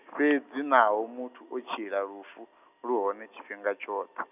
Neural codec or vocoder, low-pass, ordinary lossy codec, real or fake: none; 3.6 kHz; none; real